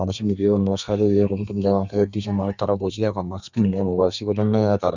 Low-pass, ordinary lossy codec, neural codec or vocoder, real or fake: 7.2 kHz; none; codec, 32 kHz, 1.9 kbps, SNAC; fake